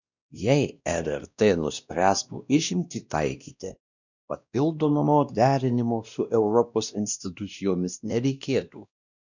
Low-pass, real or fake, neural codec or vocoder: 7.2 kHz; fake; codec, 16 kHz, 1 kbps, X-Codec, WavLM features, trained on Multilingual LibriSpeech